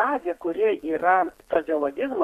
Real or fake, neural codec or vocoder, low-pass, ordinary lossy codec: fake; codec, 32 kHz, 1.9 kbps, SNAC; 14.4 kHz; MP3, 64 kbps